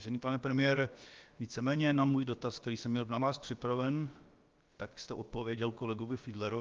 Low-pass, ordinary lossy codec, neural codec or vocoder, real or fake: 7.2 kHz; Opus, 32 kbps; codec, 16 kHz, about 1 kbps, DyCAST, with the encoder's durations; fake